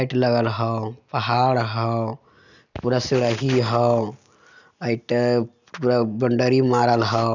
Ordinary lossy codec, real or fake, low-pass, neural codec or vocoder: none; real; 7.2 kHz; none